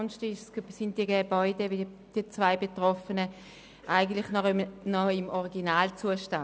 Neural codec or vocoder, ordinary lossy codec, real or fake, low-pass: none; none; real; none